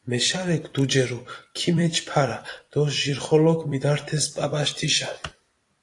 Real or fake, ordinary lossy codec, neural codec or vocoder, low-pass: fake; AAC, 48 kbps; vocoder, 44.1 kHz, 128 mel bands every 256 samples, BigVGAN v2; 10.8 kHz